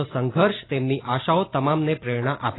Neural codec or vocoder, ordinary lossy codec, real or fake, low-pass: none; AAC, 16 kbps; real; 7.2 kHz